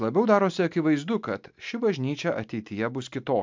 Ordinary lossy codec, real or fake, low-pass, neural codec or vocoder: MP3, 64 kbps; real; 7.2 kHz; none